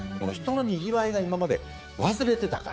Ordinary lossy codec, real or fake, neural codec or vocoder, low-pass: none; fake; codec, 16 kHz, 4 kbps, X-Codec, HuBERT features, trained on balanced general audio; none